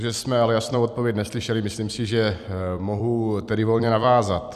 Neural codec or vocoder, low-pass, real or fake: vocoder, 44.1 kHz, 128 mel bands every 256 samples, BigVGAN v2; 14.4 kHz; fake